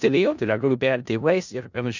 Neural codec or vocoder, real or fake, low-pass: codec, 16 kHz in and 24 kHz out, 0.4 kbps, LongCat-Audio-Codec, four codebook decoder; fake; 7.2 kHz